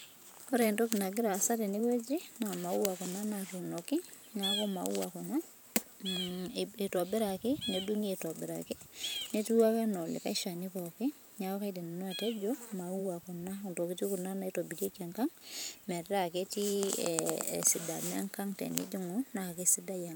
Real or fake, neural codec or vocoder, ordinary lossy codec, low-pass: fake; vocoder, 44.1 kHz, 128 mel bands every 512 samples, BigVGAN v2; none; none